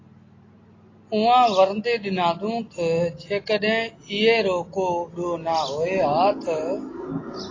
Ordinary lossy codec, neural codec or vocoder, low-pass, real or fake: AAC, 32 kbps; none; 7.2 kHz; real